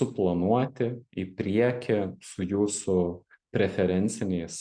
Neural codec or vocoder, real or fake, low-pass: none; real; 9.9 kHz